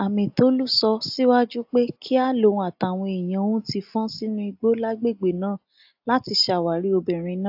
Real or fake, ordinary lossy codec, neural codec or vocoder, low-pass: real; AAC, 48 kbps; none; 5.4 kHz